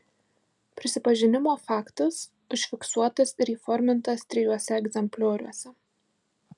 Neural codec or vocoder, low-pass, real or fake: none; 10.8 kHz; real